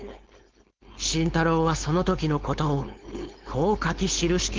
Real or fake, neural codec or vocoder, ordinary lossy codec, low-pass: fake; codec, 16 kHz, 4.8 kbps, FACodec; Opus, 16 kbps; 7.2 kHz